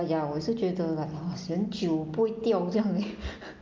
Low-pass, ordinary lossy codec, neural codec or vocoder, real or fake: 7.2 kHz; Opus, 32 kbps; none; real